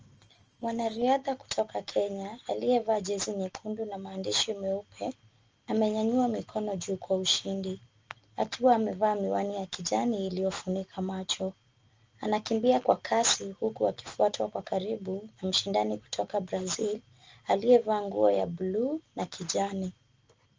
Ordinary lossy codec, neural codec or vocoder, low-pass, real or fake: Opus, 24 kbps; none; 7.2 kHz; real